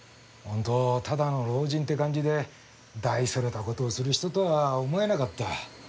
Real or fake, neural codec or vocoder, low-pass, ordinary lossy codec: real; none; none; none